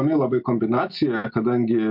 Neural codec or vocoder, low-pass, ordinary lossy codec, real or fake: none; 5.4 kHz; MP3, 48 kbps; real